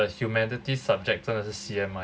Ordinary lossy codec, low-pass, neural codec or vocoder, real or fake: none; none; none; real